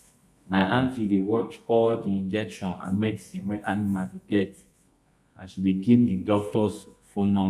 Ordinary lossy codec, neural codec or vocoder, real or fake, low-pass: none; codec, 24 kHz, 0.9 kbps, WavTokenizer, medium music audio release; fake; none